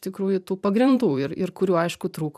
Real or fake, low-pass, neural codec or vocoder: fake; 14.4 kHz; vocoder, 44.1 kHz, 128 mel bands every 512 samples, BigVGAN v2